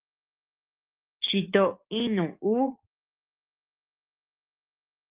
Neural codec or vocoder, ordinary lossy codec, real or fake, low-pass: codec, 44.1 kHz, 7.8 kbps, DAC; Opus, 24 kbps; fake; 3.6 kHz